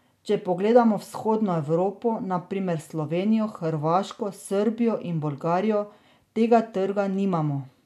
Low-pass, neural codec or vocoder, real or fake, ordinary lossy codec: 14.4 kHz; none; real; none